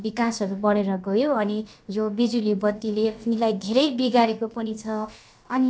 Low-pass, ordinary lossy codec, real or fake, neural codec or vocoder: none; none; fake; codec, 16 kHz, about 1 kbps, DyCAST, with the encoder's durations